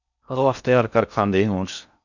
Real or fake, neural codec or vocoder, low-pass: fake; codec, 16 kHz in and 24 kHz out, 0.6 kbps, FocalCodec, streaming, 4096 codes; 7.2 kHz